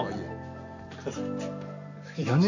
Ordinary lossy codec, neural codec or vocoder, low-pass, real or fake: AAC, 48 kbps; none; 7.2 kHz; real